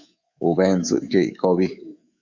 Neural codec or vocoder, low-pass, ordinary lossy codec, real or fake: codec, 24 kHz, 3.1 kbps, DualCodec; 7.2 kHz; Opus, 64 kbps; fake